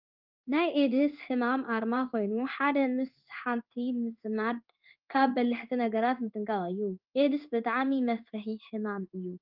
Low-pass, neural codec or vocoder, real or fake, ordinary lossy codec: 5.4 kHz; codec, 16 kHz in and 24 kHz out, 1 kbps, XY-Tokenizer; fake; Opus, 24 kbps